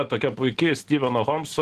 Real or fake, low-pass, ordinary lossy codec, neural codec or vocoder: real; 14.4 kHz; Opus, 16 kbps; none